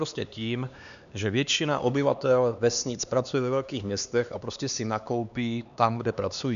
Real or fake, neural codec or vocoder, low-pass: fake; codec, 16 kHz, 2 kbps, X-Codec, HuBERT features, trained on LibriSpeech; 7.2 kHz